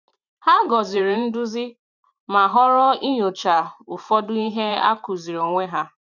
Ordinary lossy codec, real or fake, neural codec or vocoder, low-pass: none; fake; vocoder, 44.1 kHz, 128 mel bands every 512 samples, BigVGAN v2; 7.2 kHz